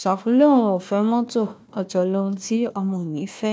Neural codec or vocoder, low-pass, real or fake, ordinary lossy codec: codec, 16 kHz, 1 kbps, FunCodec, trained on Chinese and English, 50 frames a second; none; fake; none